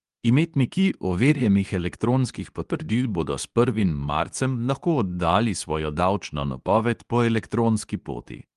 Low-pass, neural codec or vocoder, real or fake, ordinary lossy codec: 10.8 kHz; codec, 24 kHz, 0.9 kbps, WavTokenizer, medium speech release version 2; fake; Opus, 32 kbps